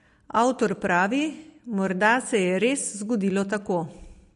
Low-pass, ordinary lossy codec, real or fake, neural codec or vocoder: 10.8 kHz; MP3, 48 kbps; real; none